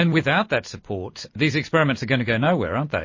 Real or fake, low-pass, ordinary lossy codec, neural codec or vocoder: real; 7.2 kHz; MP3, 32 kbps; none